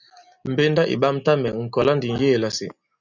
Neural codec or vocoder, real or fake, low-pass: none; real; 7.2 kHz